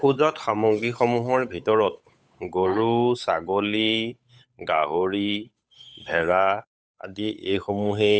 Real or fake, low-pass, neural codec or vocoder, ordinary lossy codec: fake; none; codec, 16 kHz, 8 kbps, FunCodec, trained on Chinese and English, 25 frames a second; none